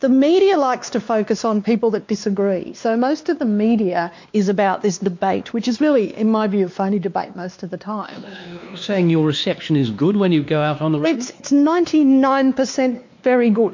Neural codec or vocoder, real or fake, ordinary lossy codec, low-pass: codec, 16 kHz, 2 kbps, X-Codec, WavLM features, trained on Multilingual LibriSpeech; fake; MP3, 48 kbps; 7.2 kHz